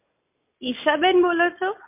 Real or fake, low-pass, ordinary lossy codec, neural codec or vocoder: real; 3.6 kHz; MP3, 24 kbps; none